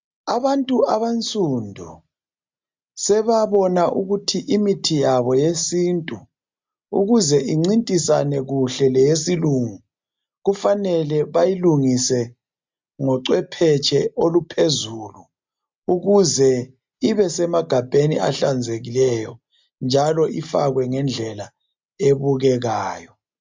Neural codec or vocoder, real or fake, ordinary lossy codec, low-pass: none; real; MP3, 64 kbps; 7.2 kHz